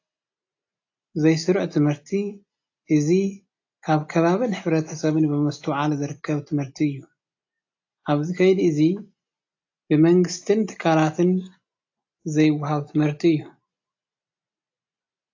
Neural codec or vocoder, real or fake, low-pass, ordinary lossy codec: none; real; 7.2 kHz; AAC, 48 kbps